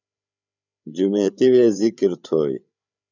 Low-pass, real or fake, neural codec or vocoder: 7.2 kHz; fake; codec, 16 kHz, 8 kbps, FreqCodec, larger model